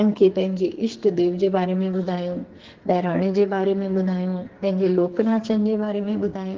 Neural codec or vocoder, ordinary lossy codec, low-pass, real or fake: codec, 44.1 kHz, 2.6 kbps, SNAC; Opus, 16 kbps; 7.2 kHz; fake